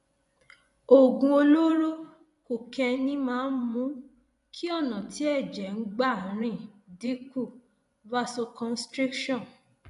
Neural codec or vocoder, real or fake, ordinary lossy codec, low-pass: vocoder, 24 kHz, 100 mel bands, Vocos; fake; none; 10.8 kHz